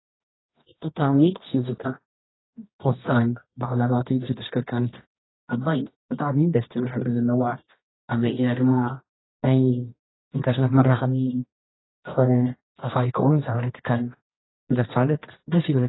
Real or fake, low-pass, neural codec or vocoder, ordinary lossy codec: fake; 7.2 kHz; codec, 24 kHz, 0.9 kbps, WavTokenizer, medium music audio release; AAC, 16 kbps